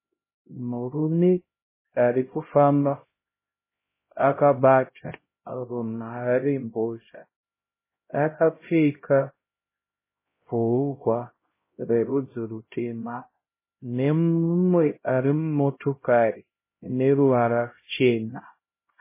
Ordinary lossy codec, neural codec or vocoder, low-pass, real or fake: MP3, 16 kbps; codec, 16 kHz, 0.5 kbps, X-Codec, HuBERT features, trained on LibriSpeech; 3.6 kHz; fake